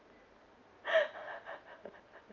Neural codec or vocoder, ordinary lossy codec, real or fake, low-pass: none; Opus, 32 kbps; real; 7.2 kHz